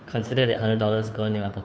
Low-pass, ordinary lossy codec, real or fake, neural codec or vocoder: none; none; fake; codec, 16 kHz, 2 kbps, FunCodec, trained on Chinese and English, 25 frames a second